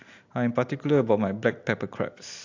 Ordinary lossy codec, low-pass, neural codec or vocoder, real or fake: AAC, 48 kbps; 7.2 kHz; none; real